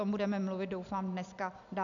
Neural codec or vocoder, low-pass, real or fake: none; 7.2 kHz; real